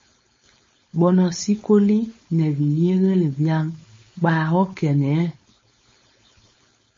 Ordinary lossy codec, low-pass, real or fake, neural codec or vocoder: MP3, 32 kbps; 7.2 kHz; fake; codec, 16 kHz, 4.8 kbps, FACodec